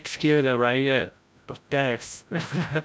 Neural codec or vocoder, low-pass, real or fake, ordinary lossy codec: codec, 16 kHz, 0.5 kbps, FreqCodec, larger model; none; fake; none